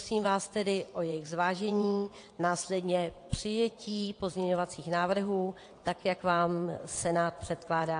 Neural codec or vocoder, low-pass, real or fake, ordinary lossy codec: vocoder, 22.05 kHz, 80 mel bands, WaveNeXt; 9.9 kHz; fake; AAC, 48 kbps